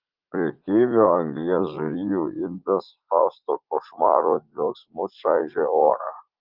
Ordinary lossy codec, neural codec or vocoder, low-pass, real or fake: Opus, 32 kbps; vocoder, 44.1 kHz, 80 mel bands, Vocos; 5.4 kHz; fake